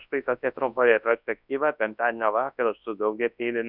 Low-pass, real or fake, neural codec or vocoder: 5.4 kHz; fake; codec, 24 kHz, 0.9 kbps, WavTokenizer, large speech release